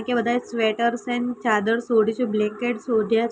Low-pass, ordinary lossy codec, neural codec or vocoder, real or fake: none; none; none; real